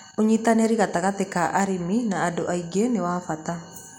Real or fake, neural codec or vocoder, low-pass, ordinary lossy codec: real; none; 19.8 kHz; none